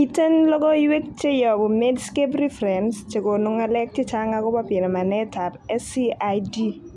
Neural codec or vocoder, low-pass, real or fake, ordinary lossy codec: none; none; real; none